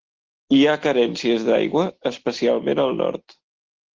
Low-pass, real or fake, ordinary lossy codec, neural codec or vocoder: 7.2 kHz; real; Opus, 16 kbps; none